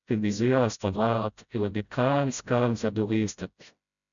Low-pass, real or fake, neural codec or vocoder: 7.2 kHz; fake; codec, 16 kHz, 0.5 kbps, FreqCodec, smaller model